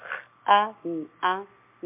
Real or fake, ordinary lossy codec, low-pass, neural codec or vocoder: real; MP3, 32 kbps; 3.6 kHz; none